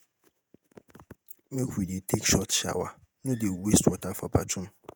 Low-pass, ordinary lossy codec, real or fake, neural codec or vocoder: none; none; fake; vocoder, 48 kHz, 128 mel bands, Vocos